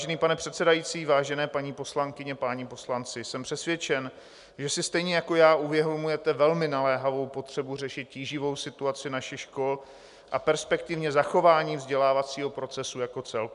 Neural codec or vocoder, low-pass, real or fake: none; 10.8 kHz; real